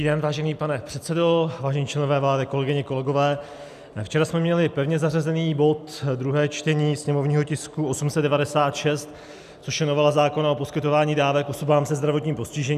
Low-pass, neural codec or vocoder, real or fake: 14.4 kHz; none; real